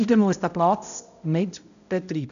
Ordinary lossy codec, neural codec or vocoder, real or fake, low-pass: none; codec, 16 kHz, 0.5 kbps, X-Codec, HuBERT features, trained on balanced general audio; fake; 7.2 kHz